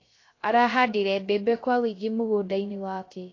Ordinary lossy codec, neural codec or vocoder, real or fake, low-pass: AAC, 32 kbps; codec, 16 kHz, 0.3 kbps, FocalCodec; fake; 7.2 kHz